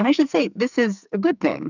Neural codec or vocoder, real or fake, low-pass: codec, 44.1 kHz, 2.6 kbps, SNAC; fake; 7.2 kHz